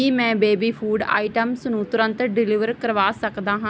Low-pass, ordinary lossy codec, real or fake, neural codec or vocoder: none; none; real; none